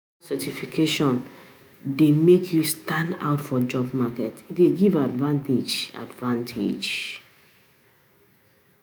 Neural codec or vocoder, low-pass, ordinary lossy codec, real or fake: autoencoder, 48 kHz, 128 numbers a frame, DAC-VAE, trained on Japanese speech; none; none; fake